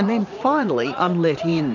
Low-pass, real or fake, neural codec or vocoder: 7.2 kHz; fake; vocoder, 44.1 kHz, 80 mel bands, Vocos